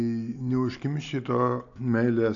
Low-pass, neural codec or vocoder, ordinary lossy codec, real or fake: 7.2 kHz; none; AAC, 48 kbps; real